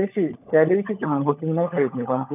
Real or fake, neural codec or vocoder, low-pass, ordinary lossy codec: fake; codec, 16 kHz, 16 kbps, FunCodec, trained on LibriTTS, 50 frames a second; 3.6 kHz; none